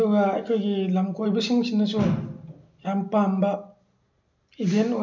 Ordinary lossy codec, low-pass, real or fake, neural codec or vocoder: MP3, 64 kbps; 7.2 kHz; real; none